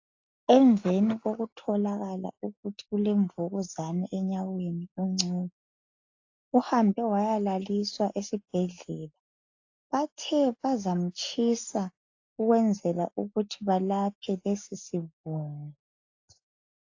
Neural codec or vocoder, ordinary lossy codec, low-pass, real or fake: none; AAC, 48 kbps; 7.2 kHz; real